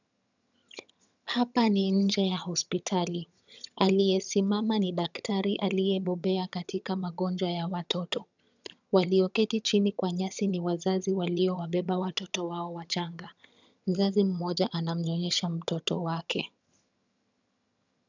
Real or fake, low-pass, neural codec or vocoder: fake; 7.2 kHz; vocoder, 22.05 kHz, 80 mel bands, HiFi-GAN